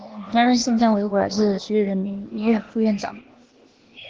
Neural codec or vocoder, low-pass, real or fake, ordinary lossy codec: codec, 16 kHz, 0.8 kbps, ZipCodec; 7.2 kHz; fake; Opus, 16 kbps